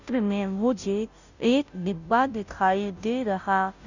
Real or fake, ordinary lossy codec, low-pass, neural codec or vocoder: fake; none; 7.2 kHz; codec, 16 kHz, 0.5 kbps, FunCodec, trained on Chinese and English, 25 frames a second